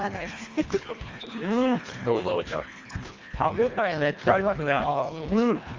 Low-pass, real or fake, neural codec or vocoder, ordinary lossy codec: 7.2 kHz; fake; codec, 24 kHz, 1.5 kbps, HILCodec; Opus, 32 kbps